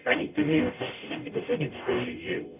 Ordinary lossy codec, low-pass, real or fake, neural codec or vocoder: none; 3.6 kHz; fake; codec, 44.1 kHz, 0.9 kbps, DAC